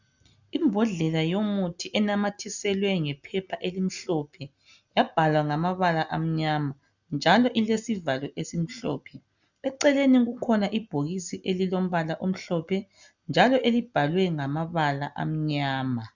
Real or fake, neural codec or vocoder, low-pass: real; none; 7.2 kHz